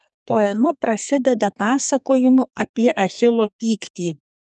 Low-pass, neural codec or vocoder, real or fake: 10.8 kHz; codec, 32 kHz, 1.9 kbps, SNAC; fake